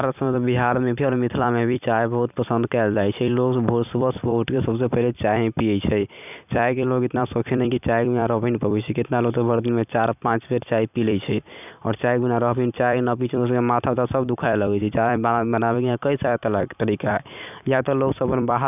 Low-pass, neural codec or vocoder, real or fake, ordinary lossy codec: 3.6 kHz; vocoder, 44.1 kHz, 128 mel bands every 256 samples, BigVGAN v2; fake; none